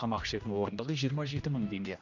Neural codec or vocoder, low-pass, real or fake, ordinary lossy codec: codec, 16 kHz, 1 kbps, X-Codec, HuBERT features, trained on general audio; 7.2 kHz; fake; none